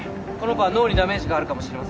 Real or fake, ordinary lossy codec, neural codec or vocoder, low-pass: real; none; none; none